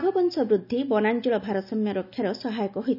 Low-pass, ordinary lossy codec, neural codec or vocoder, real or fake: 5.4 kHz; none; none; real